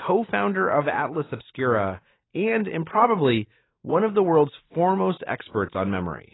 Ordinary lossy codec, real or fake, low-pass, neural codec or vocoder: AAC, 16 kbps; real; 7.2 kHz; none